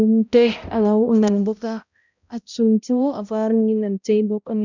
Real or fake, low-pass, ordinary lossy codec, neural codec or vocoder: fake; 7.2 kHz; none; codec, 16 kHz, 0.5 kbps, X-Codec, HuBERT features, trained on balanced general audio